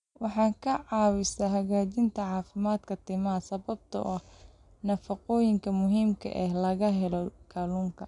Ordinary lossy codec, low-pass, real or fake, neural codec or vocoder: none; 10.8 kHz; real; none